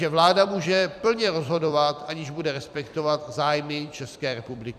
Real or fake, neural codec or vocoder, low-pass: fake; vocoder, 44.1 kHz, 128 mel bands every 256 samples, BigVGAN v2; 14.4 kHz